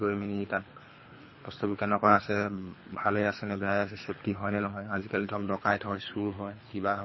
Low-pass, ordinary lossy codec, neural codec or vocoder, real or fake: 7.2 kHz; MP3, 24 kbps; codec, 24 kHz, 3 kbps, HILCodec; fake